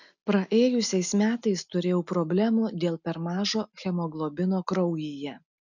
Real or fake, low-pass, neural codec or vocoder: real; 7.2 kHz; none